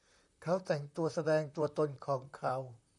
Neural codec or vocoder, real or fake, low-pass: vocoder, 44.1 kHz, 128 mel bands, Pupu-Vocoder; fake; 10.8 kHz